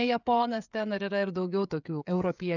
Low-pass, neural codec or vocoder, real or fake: 7.2 kHz; codec, 16 kHz, 16 kbps, FreqCodec, smaller model; fake